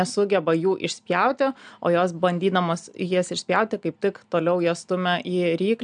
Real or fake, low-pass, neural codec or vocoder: real; 9.9 kHz; none